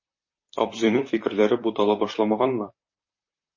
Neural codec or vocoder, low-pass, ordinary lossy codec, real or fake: vocoder, 44.1 kHz, 128 mel bands, Pupu-Vocoder; 7.2 kHz; MP3, 32 kbps; fake